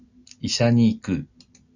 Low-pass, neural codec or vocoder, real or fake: 7.2 kHz; none; real